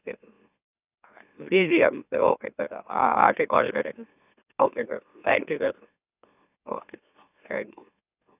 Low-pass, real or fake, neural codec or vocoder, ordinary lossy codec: 3.6 kHz; fake; autoencoder, 44.1 kHz, a latent of 192 numbers a frame, MeloTTS; none